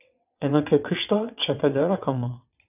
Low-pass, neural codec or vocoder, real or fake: 3.6 kHz; vocoder, 22.05 kHz, 80 mel bands, WaveNeXt; fake